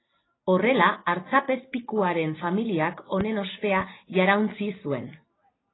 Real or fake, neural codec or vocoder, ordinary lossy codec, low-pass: real; none; AAC, 16 kbps; 7.2 kHz